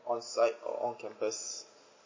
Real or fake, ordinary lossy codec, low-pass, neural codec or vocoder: real; MP3, 32 kbps; 7.2 kHz; none